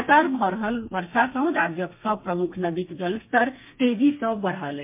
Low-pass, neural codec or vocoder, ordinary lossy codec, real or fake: 3.6 kHz; codec, 32 kHz, 1.9 kbps, SNAC; MP3, 32 kbps; fake